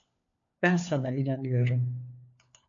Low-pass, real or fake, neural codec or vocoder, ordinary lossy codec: 7.2 kHz; fake; codec, 16 kHz, 4 kbps, FunCodec, trained on LibriTTS, 50 frames a second; AAC, 48 kbps